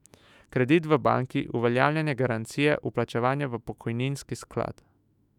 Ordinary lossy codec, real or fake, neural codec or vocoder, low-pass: none; fake; autoencoder, 48 kHz, 128 numbers a frame, DAC-VAE, trained on Japanese speech; 19.8 kHz